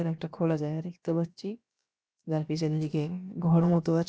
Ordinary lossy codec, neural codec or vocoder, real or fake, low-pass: none; codec, 16 kHz, about 1 kbps, DyCAST, with the encoder's durations; fake; none